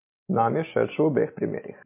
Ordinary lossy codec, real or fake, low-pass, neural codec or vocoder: MP3, 24 kbps; real; 3.6 kHz; none